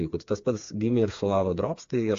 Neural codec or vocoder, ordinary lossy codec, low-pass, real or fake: codec, 16 kHz, 4 kbps, FreqCodec, smaller model; AAC, 48 kbps; 7.2 kHz; fake